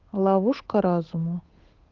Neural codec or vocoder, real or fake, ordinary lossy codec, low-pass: codec, 16 kHz, 8 kbps, FunCodec, trained on Chinese and English, 25 frames a second; fake; Opus, 24 kbps; 7.2 kHz